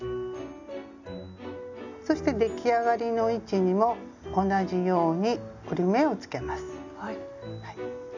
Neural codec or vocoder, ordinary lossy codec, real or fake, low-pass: none; none; real; 7.2 kHz